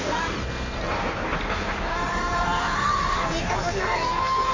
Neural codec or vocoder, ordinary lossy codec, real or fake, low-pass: codec, 16 kHz in and 24 kHz out, 1.1 kbps, FireRedTTS-2 codec; MP3, 64 kbps; fake; 7.2 kHz